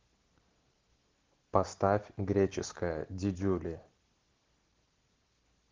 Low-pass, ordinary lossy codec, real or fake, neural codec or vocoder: 7.2 kHz; Opus, 16 kbps; real; none